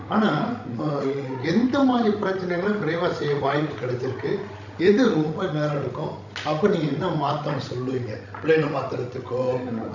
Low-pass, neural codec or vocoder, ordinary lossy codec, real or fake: 7.2 kHz; codec, 16 kHz, 16 kbps, FreqCodec, larger model; none; fake